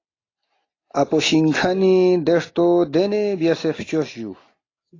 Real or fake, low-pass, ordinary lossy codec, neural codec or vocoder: real; 7.2 kHz; AAC, 32 kbps; none